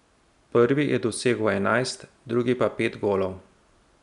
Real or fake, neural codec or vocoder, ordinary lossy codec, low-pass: real; none; none; 10.8 kHz